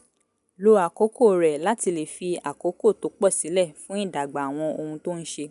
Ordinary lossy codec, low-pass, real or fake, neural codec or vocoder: none; 10.8 kHz; real; none